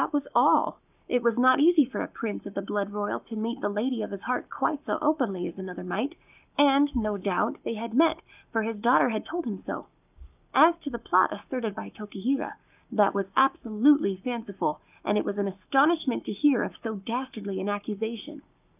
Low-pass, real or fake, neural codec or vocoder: 3.6 kHz; fake; codec, 44.1 kHz, 7.8 kbps, Pupu-Codec